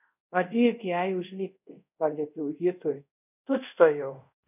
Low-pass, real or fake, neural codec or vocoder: 3.6 kHz; fake; codec, 24 kHz, 0.5 kbps, DualCodec